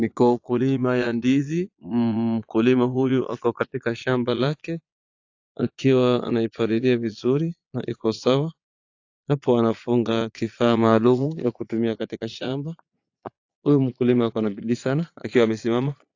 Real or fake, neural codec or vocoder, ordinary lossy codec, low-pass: fake; vocoder, 22.05 kHz, 80 mel bands, Vocos; AAC, 48 kbps; 7.2 kHz